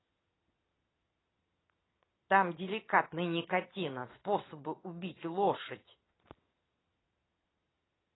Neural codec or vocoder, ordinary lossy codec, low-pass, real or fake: vocoder, 22.05 kHz, 80 mel bands, Vocos; AAC, 16 kbps; 7.2 kHz; fake